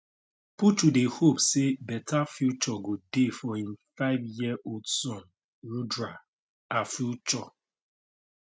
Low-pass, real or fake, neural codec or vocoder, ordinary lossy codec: none; real; none; none